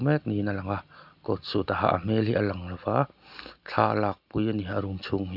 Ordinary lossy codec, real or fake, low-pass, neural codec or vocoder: none; real; 5.4 kHz; none